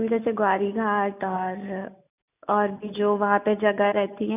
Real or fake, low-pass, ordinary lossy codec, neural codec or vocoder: real; 3.6 kHz; none; none